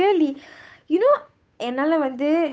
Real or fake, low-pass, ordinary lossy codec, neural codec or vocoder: fake; none; none; codec, 16 kHz, 8 kbps, FunCodec, trained on Chinese and English, 25 frames a second